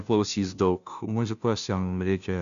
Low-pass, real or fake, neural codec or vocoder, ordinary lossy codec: 7.2 kHz; fake; codec, 16 kHz, 0.5 kbps, FunCodec, trained on Chinese and English, 25 frames a second; MP3, 96 kbps